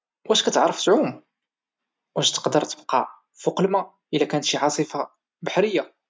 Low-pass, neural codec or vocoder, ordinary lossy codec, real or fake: none; none; none; real